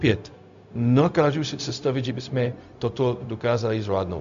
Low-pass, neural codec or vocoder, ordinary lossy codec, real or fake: 7.2 kHz; codec, 16 kHz, 0.4 kbps, LongCat-Audio-Codec; MP3, 64 kbps; fake